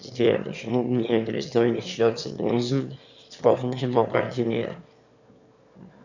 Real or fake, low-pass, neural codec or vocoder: fake; 7.2 kHz; autoencoder, 22.05 kHz, a latent of 192 numbers a frame, VITS, trained on one speaker